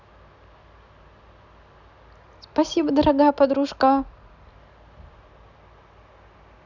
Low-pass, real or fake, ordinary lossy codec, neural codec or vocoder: 7.2 kHz; real; none; none